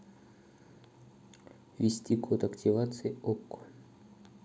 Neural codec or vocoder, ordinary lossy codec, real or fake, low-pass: none; none; real; none